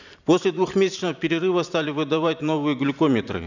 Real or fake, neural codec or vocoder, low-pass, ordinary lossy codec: fake; vocoder, 44.1 kHz, 128 mel bands every 512 samples, BigVGAN v2; 7.2 kHz; none